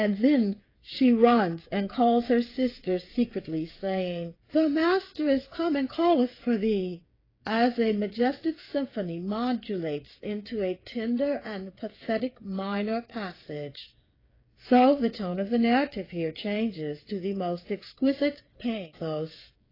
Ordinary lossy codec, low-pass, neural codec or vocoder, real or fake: AAC, 24 kbps; 5.4 kHz; codec, 16 kHz, 8 kbps, FreqCodec, smaller model; fake